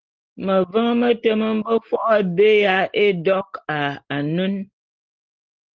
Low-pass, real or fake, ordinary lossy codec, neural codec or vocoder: 7.2 kHz; real; Opus, 16 kbps; none